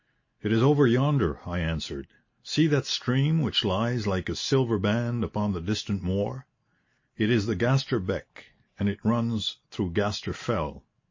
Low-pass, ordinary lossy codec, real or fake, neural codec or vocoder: 7.2 kHz; MP3, 32 kbps; real; none